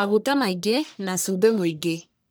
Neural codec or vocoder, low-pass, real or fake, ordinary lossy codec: codec, 44.1 kHz, 1.7 kbps, Pupu-Codec; none; fake; none